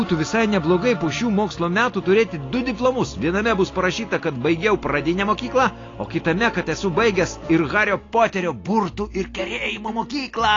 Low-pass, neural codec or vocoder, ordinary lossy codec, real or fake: 7.2 kHz; none; AAC, 32 kbps; real